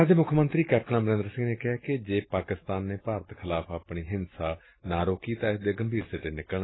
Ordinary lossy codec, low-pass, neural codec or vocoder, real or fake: AAC, 16 kbps; 7.2 kHz; none; real